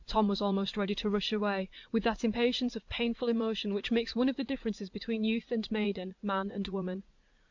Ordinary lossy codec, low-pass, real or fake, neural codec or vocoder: MP3, 64 kbps; 7.2 kHz; fake; vocoder, 44.1 kHz, 80 mel bands, Vocos